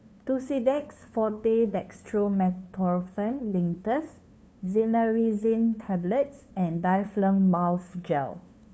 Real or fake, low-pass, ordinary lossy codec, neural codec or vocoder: fake; none; none; codec, 16 kHz, 2 kbps, FunCodec, trained on LibriTTS, 25 frames a second